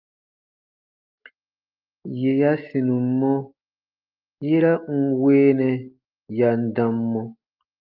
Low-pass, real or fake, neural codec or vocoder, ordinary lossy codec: 5.4 kHz; real; none; Opus, 32 kbps